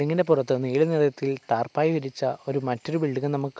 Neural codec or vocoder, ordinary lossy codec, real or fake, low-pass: none; none; real; none